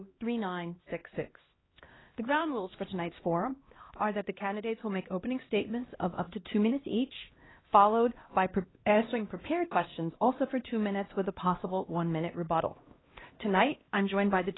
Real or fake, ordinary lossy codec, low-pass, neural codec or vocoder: fake; AAC, 16 kbps; 7.2 kHz; codec, 16 kHz, 1 kbps, X-Codec, HuBERT features, trained on LibriSpeech